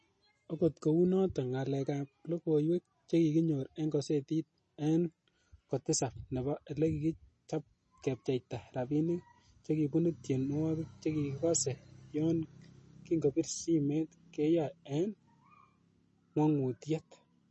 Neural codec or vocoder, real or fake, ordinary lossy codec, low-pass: none; real; MP3, 32 kbps; 10.8 kHz